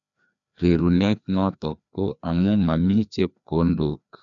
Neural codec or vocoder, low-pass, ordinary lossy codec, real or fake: codec, 16 kHz, 2 kbps, FreqCodec, larger model; 7.2 kHz; none; fake